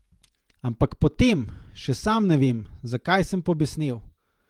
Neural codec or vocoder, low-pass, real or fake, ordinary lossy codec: vocoder, 48 kHz, 128 mel bands, Vocos; 19.8 kHz; fake; Opus, 32 kbps